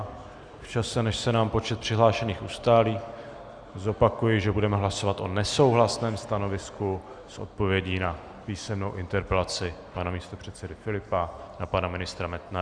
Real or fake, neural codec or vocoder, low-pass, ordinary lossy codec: real; none; 9.9 kHz; Opus, 64 kbps